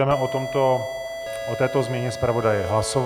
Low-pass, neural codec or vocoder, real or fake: 19.8 kHz; none; real